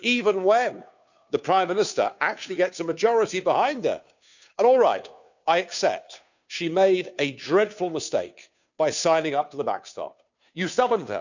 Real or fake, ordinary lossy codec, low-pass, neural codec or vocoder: fake; none; 7.2 kHz; codec, 16 kHz, 2 kbps, FunCodec, trained on Chinese and English, 25 frames a second